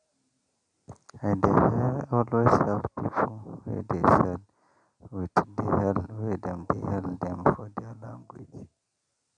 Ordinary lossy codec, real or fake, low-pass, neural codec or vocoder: none; real; 9.9 kHz; none